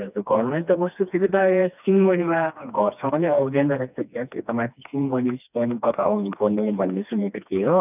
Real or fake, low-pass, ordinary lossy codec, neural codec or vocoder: fake; 3.6 kHz; none; codec, 16 kHz, 2 kbps, FreqCodec, smaller model